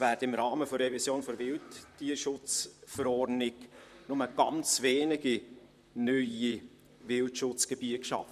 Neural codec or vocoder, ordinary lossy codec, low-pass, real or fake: vocoder, 44.1 kHz, 128 mel bands, Pupu-Vocoder; none; 14.4 kHz; fake